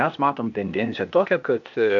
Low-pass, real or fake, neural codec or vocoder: 7.2 kHz; fake; codec, 16 kHz, 0.8 kbps, ZipCodec